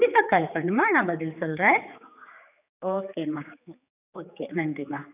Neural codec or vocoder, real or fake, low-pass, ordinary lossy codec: codec, 16 kHz, 4 kbps, X-Codec, HuBERT features, trained on general audio; fake; 3.6 kHz; none